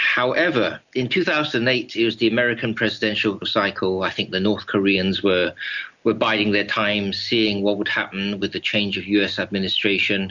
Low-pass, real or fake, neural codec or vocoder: 7.2 kHz; real; none